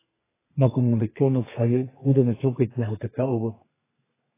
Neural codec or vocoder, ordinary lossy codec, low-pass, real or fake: codec, 32 kHz, 1.9 kbps, SNAC; AAC, 16 kbps; 3.6 kHz; fake